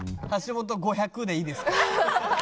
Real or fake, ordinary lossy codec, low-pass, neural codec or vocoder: real; none; none; none